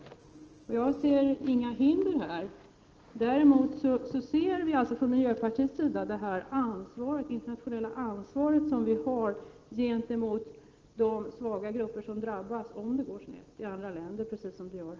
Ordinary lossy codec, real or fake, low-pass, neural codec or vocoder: Opus, 16 kbps; real; 7.2 kHz; none